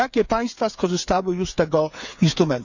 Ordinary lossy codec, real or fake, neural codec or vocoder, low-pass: none; fake; codec, 16 kHz, 8 kbps, FreqCodec, smaller model; 7.2 kHz